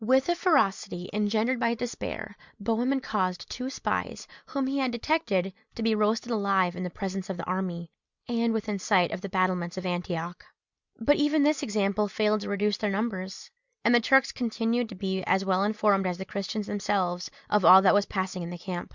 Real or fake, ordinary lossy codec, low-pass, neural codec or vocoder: real; Opus, 64 kbps; 7.2 kHz; none